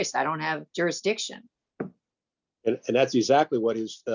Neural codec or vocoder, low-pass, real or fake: none; 7.2 kHz; real